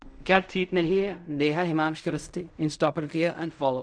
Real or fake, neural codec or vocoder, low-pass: fake; codec, 16 kHz in and 24 kHz out, 0.4 kbps, LongCat-Audio-Codec, fine tuned four codebook decoder; 9.9 kHz